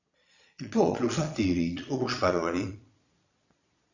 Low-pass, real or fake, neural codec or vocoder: 7.2 kHz; fake; vocoder, 22.05 kHz, 80 mel bands, Vocos